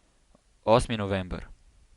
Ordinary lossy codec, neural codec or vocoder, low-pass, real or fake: Opus, 64 kbps; none; 10.8 kHz; real